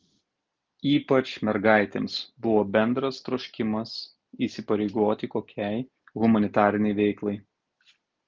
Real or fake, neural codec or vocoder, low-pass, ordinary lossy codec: real; none; 7.2 kHz; Opus, 32 kbps